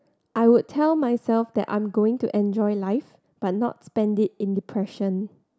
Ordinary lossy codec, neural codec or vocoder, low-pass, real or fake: none; none; none; real